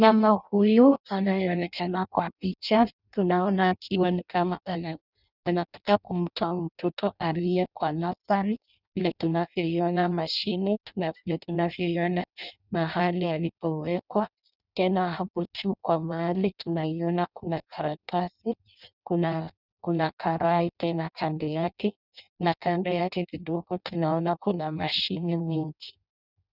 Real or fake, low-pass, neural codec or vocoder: fake; 5.4 kHz; codec, 16 kHz in and 24 kHz out, 0.6 kbps, FireRedTTS-2 codec